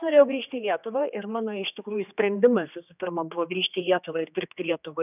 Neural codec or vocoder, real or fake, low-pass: codec, 16 kHz, 2 kbps, X-Codec, HuBERT features, trained on general audio; fake; 3.6 kHz